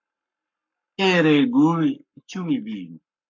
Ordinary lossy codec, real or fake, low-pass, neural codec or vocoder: AAC, 48 kbps; fake; 7.2 kHz; codec, 44.1 kHz, 7.8 kbps, Pupu-Codec